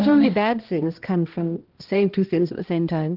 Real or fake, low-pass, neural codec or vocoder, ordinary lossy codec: fake; 5.4 kHz; codec, 16 kHz, 1 kbps, X-Codec, HuBERT features, trained on balanced general audio; Opus, 16 kbps